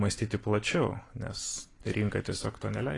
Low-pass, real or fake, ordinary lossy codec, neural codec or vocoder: 10.8 kHz; fake; AAC, 32 kbps; vocoder, 44.1 kHz, 128 mel bands every 256 samples, BigVGAN v2